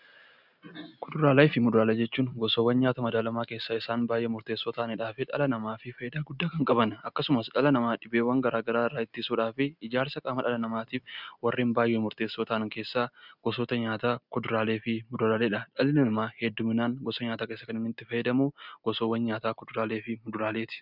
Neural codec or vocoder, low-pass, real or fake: none; 5.4 kHz; real